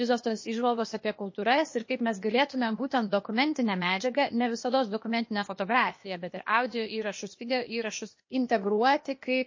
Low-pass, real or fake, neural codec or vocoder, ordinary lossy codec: 7.2 kHz; fake; codec, 16 kHz, 0.8 kbps, ZipCodec; MP3, 32 kbps